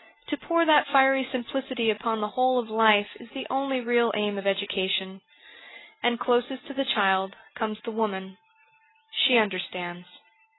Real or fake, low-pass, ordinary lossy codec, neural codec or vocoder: real; 7.2 kHz; AAC, 16 kbps; none